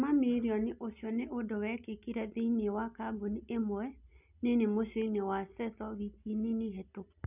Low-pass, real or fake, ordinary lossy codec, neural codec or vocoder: 3.6 kHz; real; none; none